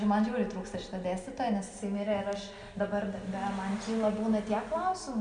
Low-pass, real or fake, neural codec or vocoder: 9.9 kHz; real; none